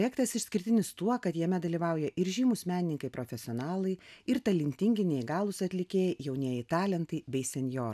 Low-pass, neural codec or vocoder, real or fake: 14.4 kHz; none; real